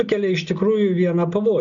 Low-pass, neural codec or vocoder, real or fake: 7.2 kHz; none; real